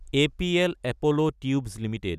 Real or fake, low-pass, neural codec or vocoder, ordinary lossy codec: real; 14.4 kHz; none; none